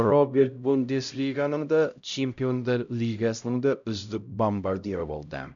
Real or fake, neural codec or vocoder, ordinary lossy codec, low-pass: fake; codec, 16 kHz, 0.5 kbps, X-Codec, HuBERT features, trained on LibriSpeech; none; 7.2 kHz